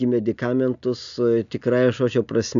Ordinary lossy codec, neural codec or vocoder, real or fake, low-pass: MP3, 96 kbps; none; real; 7.2 kHz